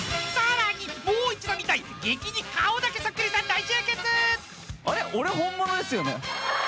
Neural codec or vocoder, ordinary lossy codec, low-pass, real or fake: none; none; none; real